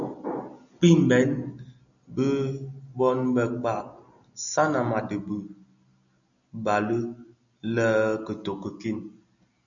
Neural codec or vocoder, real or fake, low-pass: none; real; 7.2 kHz